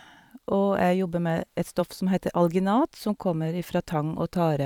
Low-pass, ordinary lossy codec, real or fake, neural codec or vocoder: 19.8 kHz; none; fake; vocoder, 44.1 kHz, 128 mel bands every 512 samples, BigVGAN v2